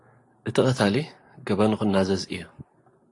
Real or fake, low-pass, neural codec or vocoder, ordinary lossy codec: real; 10.8 kHz; none; AAC, 48 kbps